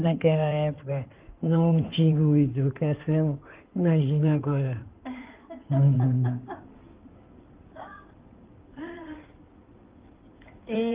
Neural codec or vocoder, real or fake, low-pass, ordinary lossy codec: codec, 16 kHz in and 24 kHz out, 2.2 kbps, FireRedTTS-2 codec; fake; 3.6 kHz; Opus, 32 kbps